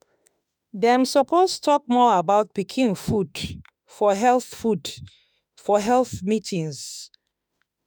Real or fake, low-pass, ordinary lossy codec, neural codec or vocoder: fake; none; none; autoencoder, 48 kHz, 32 numbers a frame, DAC-VAE, trained on Japanese speech